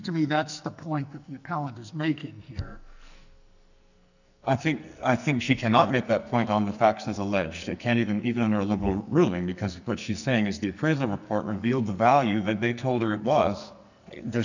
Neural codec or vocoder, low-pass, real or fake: codec, 44.1 kHz, 2.6 kbps, SNAC; 7.2 kHz; fake